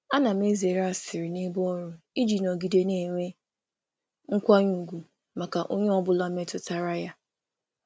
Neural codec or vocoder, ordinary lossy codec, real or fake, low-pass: none; none; real; none